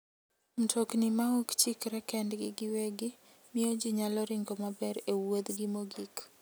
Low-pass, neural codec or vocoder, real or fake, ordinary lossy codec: none; none; real; none